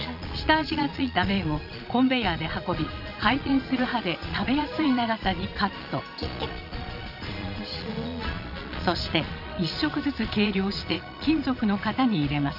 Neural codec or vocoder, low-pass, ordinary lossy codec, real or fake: vocoder, 22.05 kHz, 80 mel bands, Vocos; 5.4 kHz; none; fake